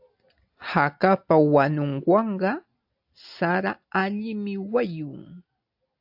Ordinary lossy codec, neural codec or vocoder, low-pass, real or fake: AAC, 48 kbps; none; 5.4 kHz; real